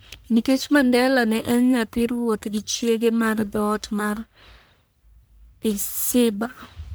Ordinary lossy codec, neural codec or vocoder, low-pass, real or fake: none; codec, 44.1 kHz, 1.7 kbps, Pupu-Codec; none; fake